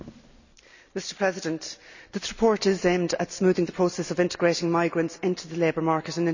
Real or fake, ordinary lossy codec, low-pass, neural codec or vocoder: real; none; 7.2 kHz; none